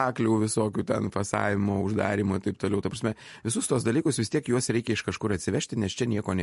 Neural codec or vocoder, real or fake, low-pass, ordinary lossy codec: none; real; 14.4 kHz; MP3, 48 kbps